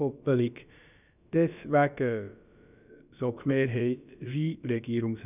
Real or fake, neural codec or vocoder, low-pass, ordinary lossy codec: fake; codec, 16 kHz, about 1 kbps, DyCAST, with the encoder's durations; 3.6 kHz; none